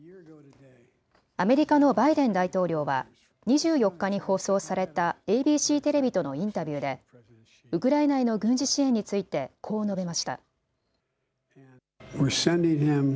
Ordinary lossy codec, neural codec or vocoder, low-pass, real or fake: none; none; none; real